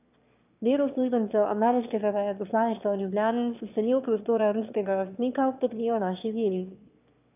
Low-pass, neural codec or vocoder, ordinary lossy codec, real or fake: 3.6 kHz; autoencoder, 22.05 kHz, a latent of 192 numbers a frame, VITS, trained on one speaker; none; fake